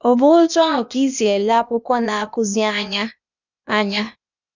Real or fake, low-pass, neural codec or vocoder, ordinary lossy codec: fake; 7.2 kHz; codec, 16 kHz, 0.8 kbps, ZipCodec; none